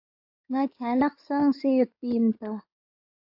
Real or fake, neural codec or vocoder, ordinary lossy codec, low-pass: fake; codec, 16 kHz in and 24 kHz out, 2.2 kbps, FireRedTTS-2 codec; AAC, 48 kbps; 5.4 kHz